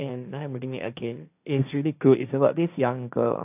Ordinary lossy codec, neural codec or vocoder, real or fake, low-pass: AAC, 32 kbps; codec, 16 kHz, 1.1 kbps, Voila-Tokenizer; fake; 3.6 kHz